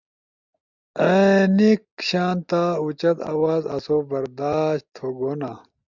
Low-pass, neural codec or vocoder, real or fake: 7.2 kHz; none; real